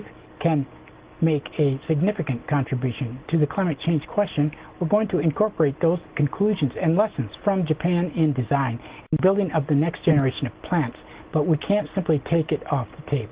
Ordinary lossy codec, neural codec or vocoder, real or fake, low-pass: Opus, 16 kbps; none; real; 3.6 kHz